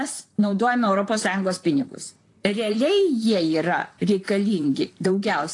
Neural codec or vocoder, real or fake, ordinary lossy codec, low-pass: vocoder, 44.1 kHz, 128 mel bands, Pupu-Vocoder; fake; AAC, 48 kbps; 10.8 kHz